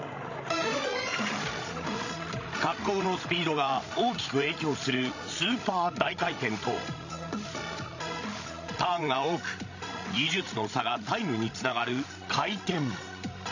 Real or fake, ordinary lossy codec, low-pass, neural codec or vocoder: fake; AAC, 48 kbps; 7.2 kHz; codec, 16 kHz, 16 kbps, FreqCodec, larger model